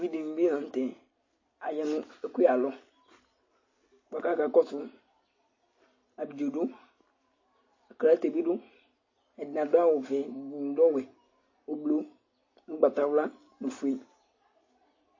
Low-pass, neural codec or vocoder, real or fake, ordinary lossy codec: 7.2 kHz; none; real; MP3, 32 kbps